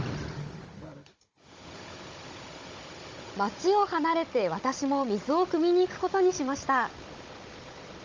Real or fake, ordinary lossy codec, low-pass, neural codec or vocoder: fake; Opus, 32 kbps; 7.2 kHz; codec, 16 kHz, 16 kbps, FunCodec, trained on Chinese and English, 50 frames a second